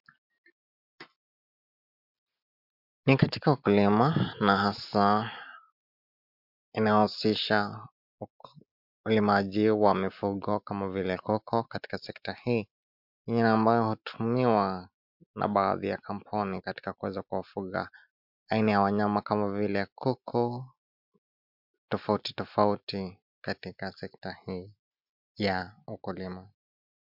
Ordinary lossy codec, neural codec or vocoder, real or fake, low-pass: MP3, 48 kbps; none; real; 5.4 kHz